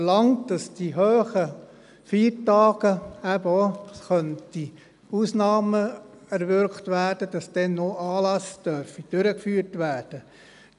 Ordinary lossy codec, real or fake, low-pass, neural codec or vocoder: none; real; 10.8 kHz; none